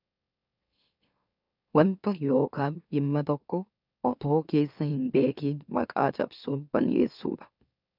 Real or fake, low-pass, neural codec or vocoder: fake; 5.4 kHz; autoencoder, 44.1 kHz, a latent of 192 numbers a frame, MeloTTS